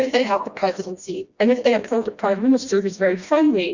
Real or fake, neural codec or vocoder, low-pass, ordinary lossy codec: fake; codec, 16 kHz, 1 kbps, FreqCodec, smaller model; 7.2 kHz; Opus, 64 kbps